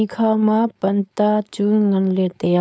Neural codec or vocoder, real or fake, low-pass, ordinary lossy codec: codec, 16 kHz, 4.8 kbps, FACodec; fake; none; none